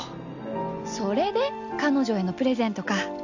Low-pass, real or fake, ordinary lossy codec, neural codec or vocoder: 7.2 kHz; real; none; none